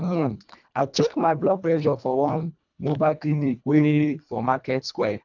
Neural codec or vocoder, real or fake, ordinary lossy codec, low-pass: codec, 24 kHz, 1.5 kbps, HILCodec; fake; none; 7.2 kHz